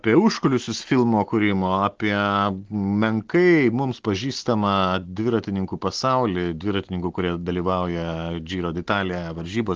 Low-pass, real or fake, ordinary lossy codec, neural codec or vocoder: 7.2 kHz; real; Opus, 16 kbps; none